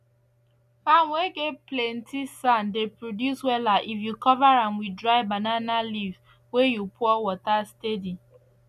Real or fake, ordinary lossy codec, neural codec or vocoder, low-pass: real; none; none; 14.4 kHz